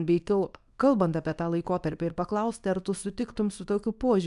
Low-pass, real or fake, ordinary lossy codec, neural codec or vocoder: 10.8 kHz; fake; MP3, 96 kbps; codec, 24 kHz, 0.9 kbps, WavTokenizer, small release